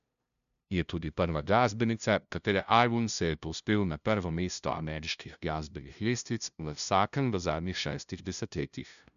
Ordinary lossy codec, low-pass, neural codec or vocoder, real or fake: none; 7.2 kHz; codec, 16 kHz, 0.5 kbps, FunCodec, trained on LibriTTS, 25 frames a second; fake